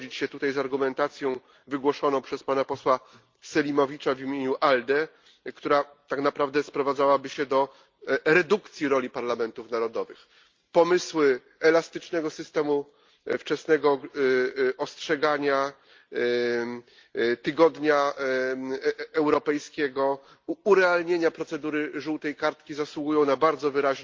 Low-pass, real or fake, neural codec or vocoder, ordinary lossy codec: 7.2 kHz; real; none; Opus, 32 kbps